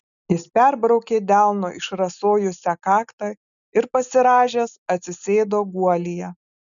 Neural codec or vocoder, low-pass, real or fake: none; 7.2 kHz; real